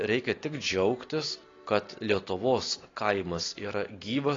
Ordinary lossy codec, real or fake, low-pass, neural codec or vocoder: AAC, 32 kbps; real; 7.2 kHz; none